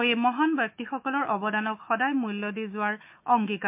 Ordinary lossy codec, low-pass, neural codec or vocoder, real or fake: MP3, 32 kbps; 3.6 kHz; autoencoder, 48 kHz, 128 numbers a frame, DAC-VAE, trained on Japanese speech; fake